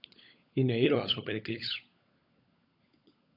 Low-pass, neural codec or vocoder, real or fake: 5.4 kHz; codec, 16 kHz, 16 kbps, FunCodec, trained on LibriTTS, 50 frames a second; fake